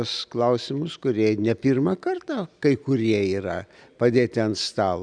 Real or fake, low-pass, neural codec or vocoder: real; 9.9 kHz; none